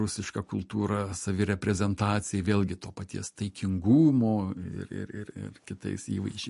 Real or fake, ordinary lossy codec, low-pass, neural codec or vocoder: real; MP3, 48 kbps; 14.4 kHz; none